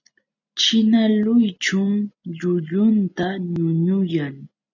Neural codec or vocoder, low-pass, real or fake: none; 7.2 kHz; real